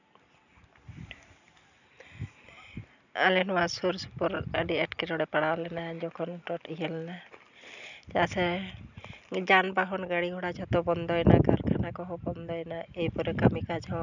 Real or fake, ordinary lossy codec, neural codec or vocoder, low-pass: real; none; none; 7.2 kHz